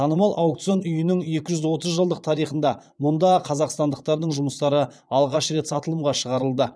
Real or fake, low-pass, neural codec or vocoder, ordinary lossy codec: fake; none; vocoder, 22.05 kHz, 80 mel bands, Vocos; none